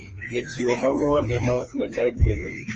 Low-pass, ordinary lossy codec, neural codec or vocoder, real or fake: 7.2 kHz; Opus, 24 kbps; codec, 16 kHz, 2 kbps, FreqCodec, larger model; fake